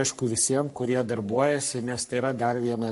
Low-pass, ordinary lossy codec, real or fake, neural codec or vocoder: 14.4 kHz; MP3, 48 kbps; fake; codec, 44.1 kHz, 3.4 kbps, Pupu-Codec